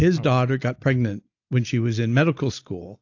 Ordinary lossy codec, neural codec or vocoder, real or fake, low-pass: AAC, 48 kbps; none; real; 7.2 kHz